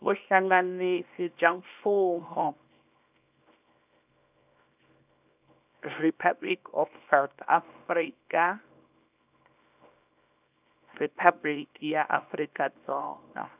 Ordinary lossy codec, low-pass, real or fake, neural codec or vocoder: none; 3.6 kHz; fake; codec, 24 kHz, 0.9 kbps, WavTokenizer, small release